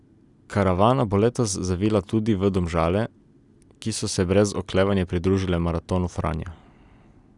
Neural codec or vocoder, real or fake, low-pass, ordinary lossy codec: none; real; 10.8 kHz; none